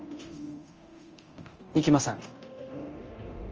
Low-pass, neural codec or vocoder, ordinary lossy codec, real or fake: 7.2 kHz; codec, 24 kHz, 0.9 kbps, DualCodec; Opus, 24 kbps; fake